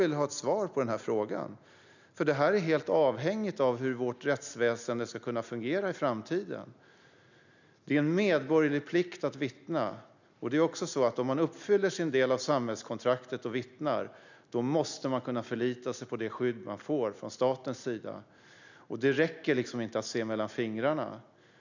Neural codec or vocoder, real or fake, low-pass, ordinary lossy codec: none; real; 7.2 kHz; none